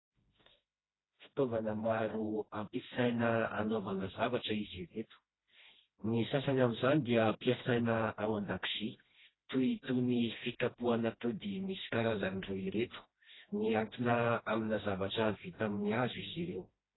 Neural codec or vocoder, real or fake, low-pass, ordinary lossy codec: codec, 16 kHz, 1 kbps, FreqCodec, smaller model; fake; 7.2 kHz; AAC, 16 kbps